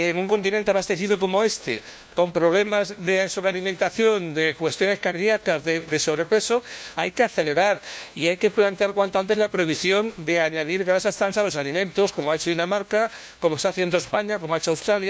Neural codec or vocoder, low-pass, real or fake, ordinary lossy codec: codec, 16 kHz, 1 kbps, FunCodec, trained on LibriTTS, 50 frames a second; none; fake; none